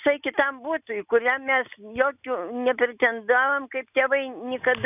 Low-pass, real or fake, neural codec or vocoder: 3.6 kHz; real; none